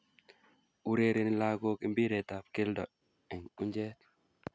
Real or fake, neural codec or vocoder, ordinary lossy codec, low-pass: real; none; none; none